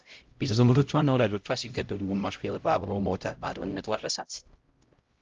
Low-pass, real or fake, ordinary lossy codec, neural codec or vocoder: 7.2 kHz; fake; Opus, 32 kbps; codec, 16 kHz, 0.5 kbps, X-Codec, HuBERT features, trained on LibriSpeech